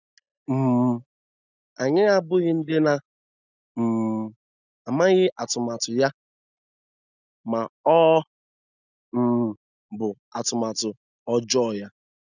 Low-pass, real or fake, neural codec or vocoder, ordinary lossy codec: 7.2 kHz; real; none; none